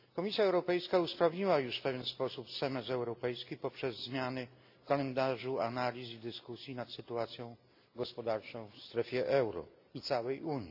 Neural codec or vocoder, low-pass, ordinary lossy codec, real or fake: none; 5.4 kHz; AAC, 48 kbps; real